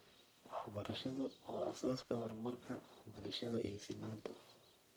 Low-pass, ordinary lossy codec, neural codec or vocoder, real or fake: none; none; codec, 44.1 kHz, 1.7 kbps, Pupu-Codec; fake